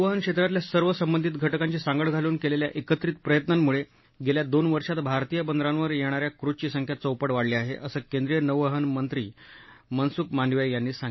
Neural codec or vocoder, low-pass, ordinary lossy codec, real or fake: none; 7.2 kHz; MP3, 24 kbps; real